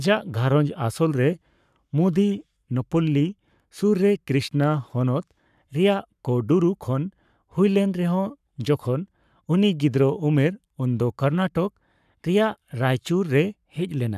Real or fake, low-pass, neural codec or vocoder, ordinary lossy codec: fake; 14.4 kHz; codec, 44.1 kHz, 7.8 kbps, Pupu-Codec; none